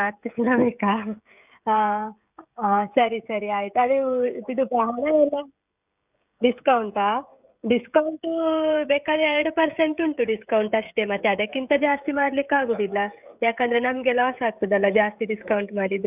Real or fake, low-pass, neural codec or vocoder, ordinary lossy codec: fake; 3.6 kHz; codec, 16 kHz, 16 kbps, FreqCodec, smaller model; none